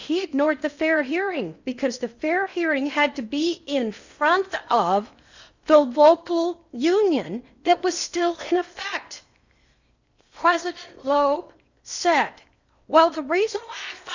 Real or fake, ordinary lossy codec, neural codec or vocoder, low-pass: fake; Opus, 64 kbps; codec, 16 kHz in and 24 kHz out, 0.8 kbps, FocalCodec, streaming, 65536 codes; 7.2 kHz